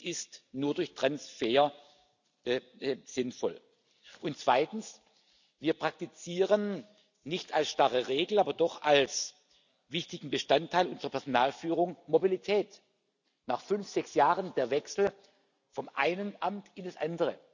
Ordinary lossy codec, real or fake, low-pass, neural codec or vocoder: none; real; 7.2 kHz; none